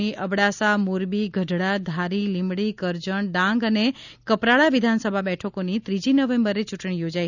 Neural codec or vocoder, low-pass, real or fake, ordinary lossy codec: none; 7.2 kHz; real; none